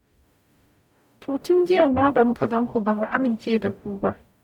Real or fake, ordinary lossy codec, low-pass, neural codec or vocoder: fake; none; 19.8 kHz; codec, 44.1 kHz, 0.9 kbps, DAC